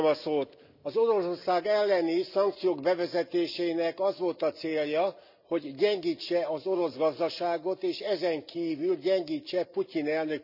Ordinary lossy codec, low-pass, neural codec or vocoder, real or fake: none; 5.4 kHz; none; real